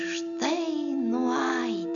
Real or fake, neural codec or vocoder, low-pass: real; none; 7.2 kHz